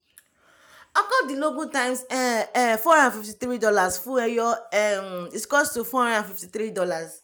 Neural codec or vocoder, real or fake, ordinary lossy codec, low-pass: none; real; none; none